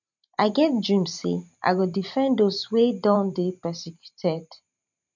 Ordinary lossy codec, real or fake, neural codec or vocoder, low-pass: none; fake; vocoder, 44.1 kHz, 128 mel bands every 512 samples, BigVGAN v2; 7.2 kHz